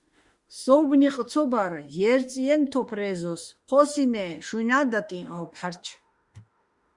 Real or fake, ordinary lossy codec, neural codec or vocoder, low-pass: fake; Opus, 64 kbps; autoencoder, 48 kHz, 32 numbers a frame, DAC-VAE, trained on Japanese speech; 10.8 kHz